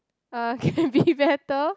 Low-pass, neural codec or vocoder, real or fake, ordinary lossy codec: none; none; real; none